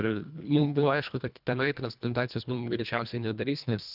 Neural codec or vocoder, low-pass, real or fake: codec, 24 kHz, 1.5 kbps, HILCodec; 5.4 kHz; fake